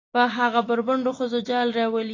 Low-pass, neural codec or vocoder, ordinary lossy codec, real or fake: 7.2 kHz; none; AAC, 32 kbps; real